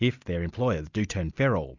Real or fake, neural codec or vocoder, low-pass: real; none; 7.2 kHz